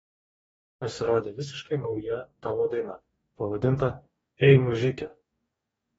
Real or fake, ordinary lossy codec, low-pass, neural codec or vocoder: fake; AAC, 24 kbps; 19.8 kHz; codec, 44.1 kHz, 2.6 kbps, DAC